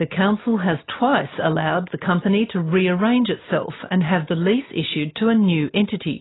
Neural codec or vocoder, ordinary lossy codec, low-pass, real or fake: none; AAC, 16 kbps; 7.2 kHz; real